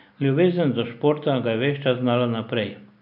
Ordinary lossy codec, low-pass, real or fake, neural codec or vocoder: none; 5.4 kHz; real; none